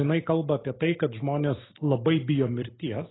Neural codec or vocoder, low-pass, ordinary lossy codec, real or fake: none; 7.2 kHz; AAC, 16 kbps; real